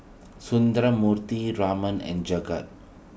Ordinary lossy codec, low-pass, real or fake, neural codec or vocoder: none; none; real; none